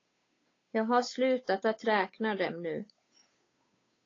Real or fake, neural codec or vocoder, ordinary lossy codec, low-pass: fake; codec, 16 kHz, 8 kbps, FunCodec, trained on Chinese and English, 25 frames a second; AAC, 32 kbps; 7.2 kHz